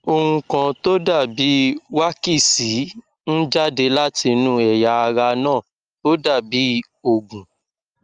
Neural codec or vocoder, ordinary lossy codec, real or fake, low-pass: none; Opus, 32 kbps; real; 9.9 kHz